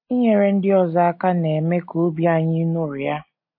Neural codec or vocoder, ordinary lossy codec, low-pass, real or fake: none; MP3, 32 kbps; 5.4 kHz; real